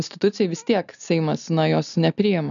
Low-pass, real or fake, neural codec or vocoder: 7.2 kHz; real; none